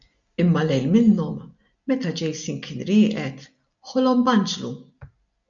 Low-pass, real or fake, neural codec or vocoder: 7.2 kHz; real; none